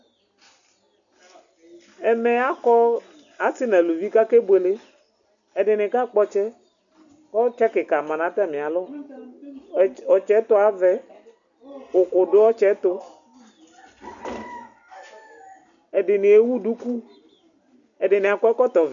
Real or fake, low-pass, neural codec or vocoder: real; 7.2 kHz; none